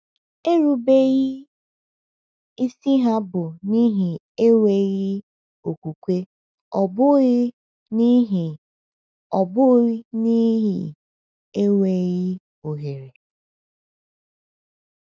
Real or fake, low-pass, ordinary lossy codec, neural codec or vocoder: real; none; none; none